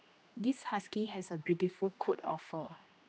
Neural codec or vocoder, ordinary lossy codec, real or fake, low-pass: codec, 16 kHz, 1 kbps, X-Codec, HuBERT features, trained on general audio; none; fake; none